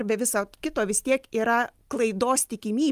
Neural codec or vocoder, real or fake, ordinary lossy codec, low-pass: none; real; Opus, 32 kbps; 14.4 kHz